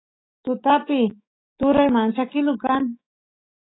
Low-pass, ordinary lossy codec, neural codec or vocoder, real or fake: 7.2 kHz; AAC, 16 kbps; autoencoder, 48 kHz, 128 numbers a frame, DAC-VAE, trained on Japanese speech; fake